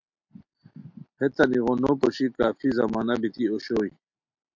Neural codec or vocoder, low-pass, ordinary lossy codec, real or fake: none; 7.2 kHz; MP3, 64 kbps; real